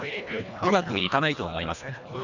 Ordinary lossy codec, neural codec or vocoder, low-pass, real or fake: none; codec, 24 kHz, 1.5 kbps, HILCodec; 7.2 kHz; fake